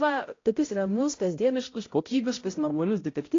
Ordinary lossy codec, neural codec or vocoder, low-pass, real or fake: AAC, 32 kbps; codec, 16 kHz, 0.5 kbps, X-Codec, HuBERT features, trained on balanced general audio; 7.2 kHz; fake